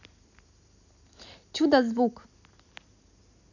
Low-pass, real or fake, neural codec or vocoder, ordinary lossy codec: 7.2 kHz; real; none; none